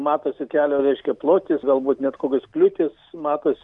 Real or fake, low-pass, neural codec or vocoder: real; 10.8 kHz; none